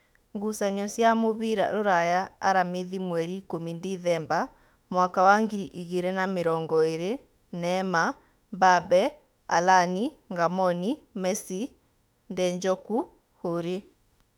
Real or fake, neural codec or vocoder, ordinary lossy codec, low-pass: fake; autoencoder, 48 kHz, 32 numbers a frame, DAC-VAE, trained on Japanese speech; none; 19.8 kHz